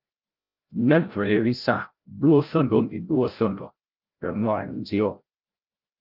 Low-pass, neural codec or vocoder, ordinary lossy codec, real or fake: 5.4 kHz; codec, 16 kHz, 0.5 kbps, FreqCodec, larger model; Opus, 32 kbps; fake